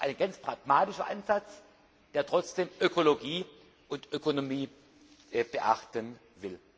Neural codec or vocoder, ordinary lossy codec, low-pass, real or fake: none; none; none; real